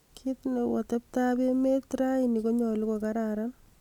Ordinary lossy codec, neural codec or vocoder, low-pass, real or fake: none; none; 19.8 kHz; real